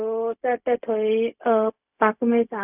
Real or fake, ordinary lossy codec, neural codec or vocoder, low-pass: fake; none; codec, 16 kHz, 0.4 kbps, LongCat-Audio-Codec; 3.6 kHz